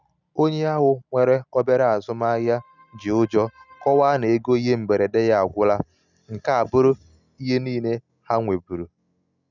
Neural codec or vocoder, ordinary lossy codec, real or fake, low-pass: none; none; real; 7.2 kHz